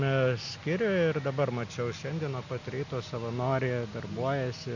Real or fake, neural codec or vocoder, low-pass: real; none; 7.2 kHz